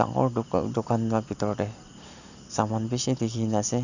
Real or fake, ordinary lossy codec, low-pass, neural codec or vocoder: real; none; 7.2 kHz; none